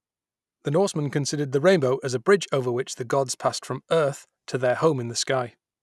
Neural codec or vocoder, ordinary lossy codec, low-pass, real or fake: none; none; none; real